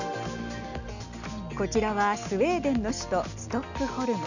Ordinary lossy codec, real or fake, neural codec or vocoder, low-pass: none; real; none; 7.2 kHz